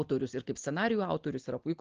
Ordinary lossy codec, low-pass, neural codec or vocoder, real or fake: Opus, 16 kbps; 7.2 kHz; none; real